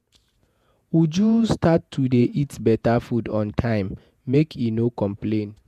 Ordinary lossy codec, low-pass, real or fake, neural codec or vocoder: MP3, 96 kbps; 14.4 kHz; fake; vocoder, 48 kHz, 128 mel bands, Vocos